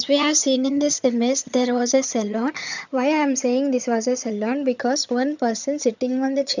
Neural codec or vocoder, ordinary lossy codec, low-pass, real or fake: vocoder, 22.05 kHz, 80 mel bands, HiFi-GAN; none; 7.2 kHz; fake